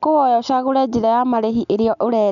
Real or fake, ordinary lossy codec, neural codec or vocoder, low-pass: real; none; none; 7.2 kHz